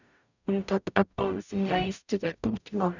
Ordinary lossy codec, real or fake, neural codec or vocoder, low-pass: none; fake; codec, 44.1 kHz, 0.9 kbps, DAC; 7.2 kHz